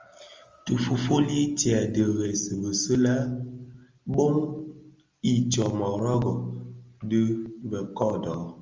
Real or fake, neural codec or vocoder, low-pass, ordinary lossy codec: real; none; 7.2 kHz; Opus, 32 kbps